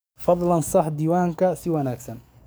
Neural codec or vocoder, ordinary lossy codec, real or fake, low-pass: codec, 44.1 kHz, 7.8 kbps, DAC; none; fake; none